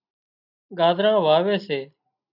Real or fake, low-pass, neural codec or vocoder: real; 5.4 kHz; none